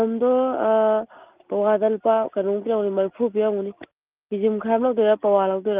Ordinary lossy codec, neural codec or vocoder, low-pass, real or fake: Opus, 24 kbps; none; 3.6 kHz; real